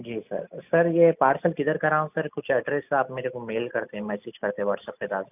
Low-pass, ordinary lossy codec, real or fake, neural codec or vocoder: 3.6 kHz; none; real; none